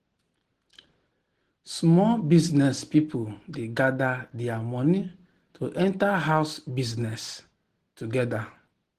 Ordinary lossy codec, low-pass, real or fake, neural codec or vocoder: Opus, 16 kbps; 14.4 kHz; real; none